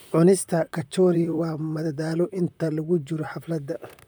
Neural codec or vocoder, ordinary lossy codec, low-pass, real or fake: vocoder, 44.1 kHz, 128 mel bands, Pupu-Vocoder; none; none; fake